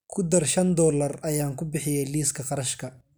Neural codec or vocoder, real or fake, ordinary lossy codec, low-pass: none; real; none; none